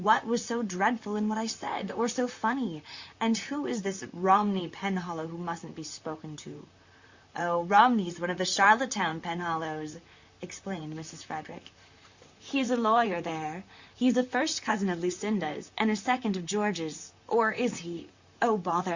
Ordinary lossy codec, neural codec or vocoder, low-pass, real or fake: Opus, 64 kbps; vocoder, 44.1 kHz, 128 mel bands, Pupu-Vocoder; 7.2 kHz; fake